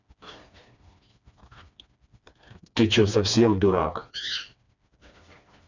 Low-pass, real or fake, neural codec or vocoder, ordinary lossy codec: 7.2 kHz; fake; codec, 16 kHz, 2 kbps, FreqCodec, smaller model; none